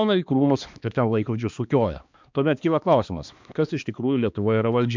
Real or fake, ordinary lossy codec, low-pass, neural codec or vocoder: fake; MP3, 64 kbps; 7.2 kHz; codec, 16 kHz, 2 kbps, X-Codec, HuBERT features, trained on balanced general audio